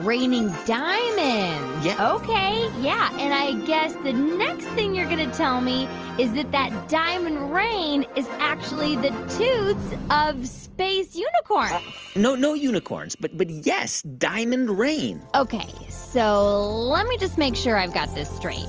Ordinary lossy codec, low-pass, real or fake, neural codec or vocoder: Opus, 24 kbps; 7.2 kHz; real; none